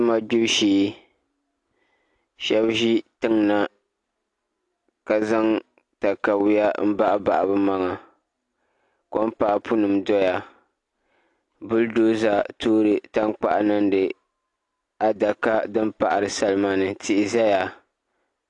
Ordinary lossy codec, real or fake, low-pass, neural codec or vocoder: AAC, 48 kbps; real; 10.8 kHz; none